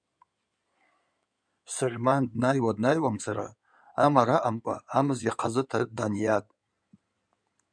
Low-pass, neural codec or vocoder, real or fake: 9.9 kHz; codec, 16 kHz in and 24 kHz out, 2.2 kbps, FireRedTTS-2 codec; fake